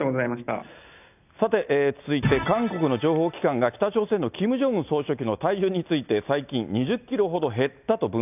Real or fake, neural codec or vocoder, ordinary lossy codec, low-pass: real; none; none; 3.6 kHz